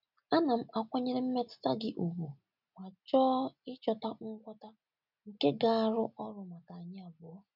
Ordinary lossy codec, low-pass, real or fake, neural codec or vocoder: none; 5.4 kHz; real; none